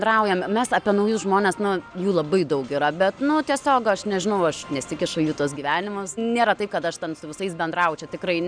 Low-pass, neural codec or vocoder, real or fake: 9.9 kHz; none; real